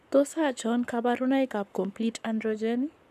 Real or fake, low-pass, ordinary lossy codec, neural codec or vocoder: fake; 14.4 kHz; none; codec, 44.1 kHz, 7.8 kbps, Pupu-Codec